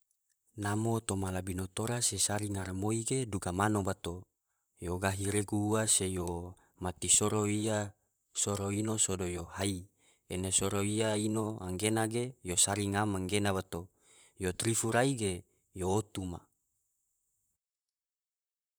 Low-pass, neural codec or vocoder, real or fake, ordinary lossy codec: none; vocoder, 44.1 kHz, 128 mel bands, Pupu-Vocoder; fake; none